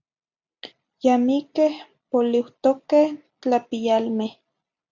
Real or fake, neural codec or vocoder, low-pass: real; none; 7.2 kHz